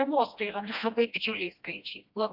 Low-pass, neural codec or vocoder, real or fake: 5.4 kHz; codec, 16 kHz, 1 kbps, FreqCodec, smaller model; fake